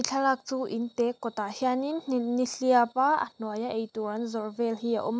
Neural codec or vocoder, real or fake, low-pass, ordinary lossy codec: none; real; none; none